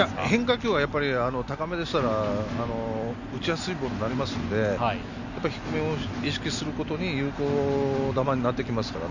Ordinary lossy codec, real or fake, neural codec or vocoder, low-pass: Opus, 64 kbps; real; none; 7.2 kHz